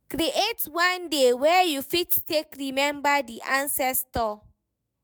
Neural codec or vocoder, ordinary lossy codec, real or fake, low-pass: autoencoder, 48 kHz, 128 numbers a frame, DAC-VAE, trained on Japanese speech; none; fake; none